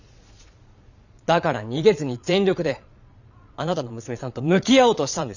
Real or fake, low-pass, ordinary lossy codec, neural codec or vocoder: fake; 7.2 kHz; none; vocoder, 22.05 kHz, 80 mel bands, Vocos